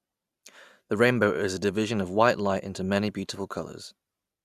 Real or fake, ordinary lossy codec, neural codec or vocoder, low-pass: real; Opus, 64 kbps; none; 14.4 kHz